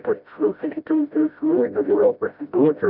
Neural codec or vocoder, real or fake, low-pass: codec, 16 kHz, 0.5 kbps, FreqCodec, smaller model; fake; 5.4 kHz